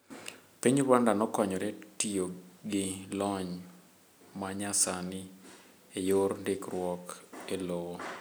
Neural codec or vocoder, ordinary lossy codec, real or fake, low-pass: none; none; real; none